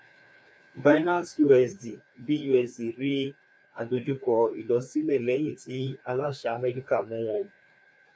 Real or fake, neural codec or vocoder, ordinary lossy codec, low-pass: fake; codec, 16 kHz, 2 kbps, FreqCodec, larger model; none; none